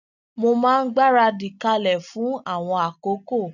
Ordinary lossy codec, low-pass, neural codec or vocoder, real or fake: none; 7.2 kHz; none; real